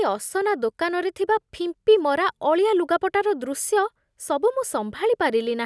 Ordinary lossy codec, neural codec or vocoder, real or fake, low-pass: none; none; real; none